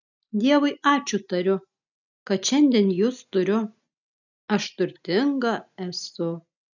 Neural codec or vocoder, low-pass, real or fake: none; 7.2 kHz; real